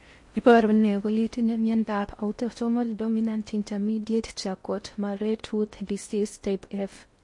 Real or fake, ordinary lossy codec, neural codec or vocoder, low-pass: fake; MP3, 48 kbps; codec, 16 kHz in and 24 kHz out, 0.6 kbps, FocalCodec, streaming, 4096 codes; 10.8 kHz